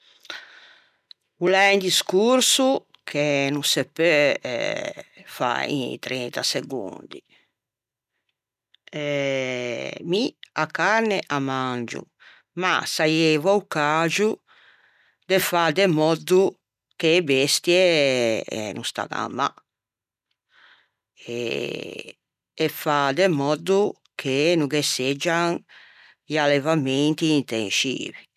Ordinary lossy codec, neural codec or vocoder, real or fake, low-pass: none; none; real; 14.4 kHz